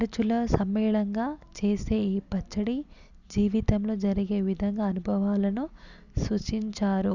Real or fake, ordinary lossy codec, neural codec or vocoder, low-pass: real; none; none; 7.2 kHz